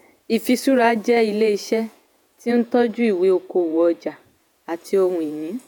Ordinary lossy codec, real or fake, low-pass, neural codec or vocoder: none; fake; none; vocoder, 48 kHz, 128 mel bands, Vocos